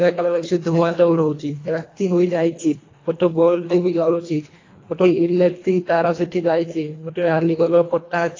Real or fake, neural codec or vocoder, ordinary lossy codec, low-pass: fake; codec, 24 kHz, 1.5 kbps, HILCodec; AAC, 32 kbps; 7.2 kHz